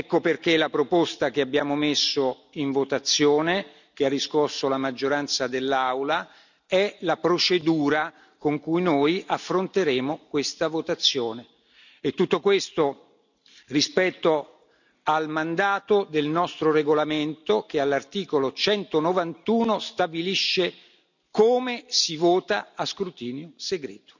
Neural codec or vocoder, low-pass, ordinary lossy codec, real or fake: none; 7.2 kHz; none; real